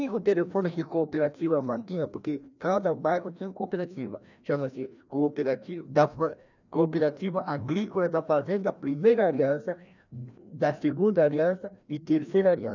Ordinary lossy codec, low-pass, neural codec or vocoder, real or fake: none; 7.2 kHz; codec, 16 kHz, 1 kbps, FreqCodec, larger model; fake